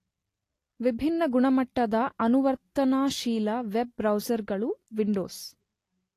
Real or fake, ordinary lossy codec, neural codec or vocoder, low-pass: real; AAC, 48 kbps; none; 14.4 kHz